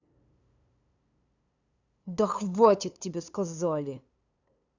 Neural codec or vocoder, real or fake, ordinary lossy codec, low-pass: codec, 16 kHz, 2 kbps, FunCodec, trained on LibriTTS, 25 frames a second; fake; none; 7.2 kHz